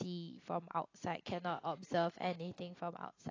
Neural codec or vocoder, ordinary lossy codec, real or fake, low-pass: none; AAC, 32 kbps; real; 7.2 kHz